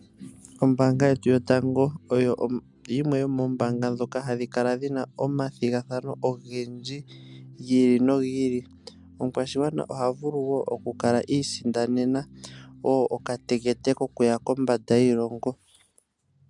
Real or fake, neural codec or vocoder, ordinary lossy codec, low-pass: real; none; MP3, 96 kbps; 10.8 kHz